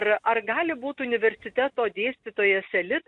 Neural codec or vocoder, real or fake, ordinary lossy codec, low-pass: none; real; MP3, 64 kbps; 10.8 kHz